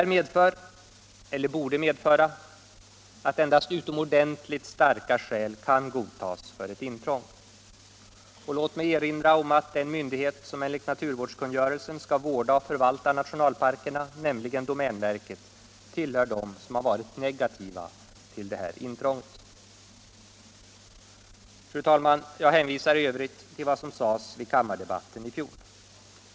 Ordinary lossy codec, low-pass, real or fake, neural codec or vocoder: none; none; real; none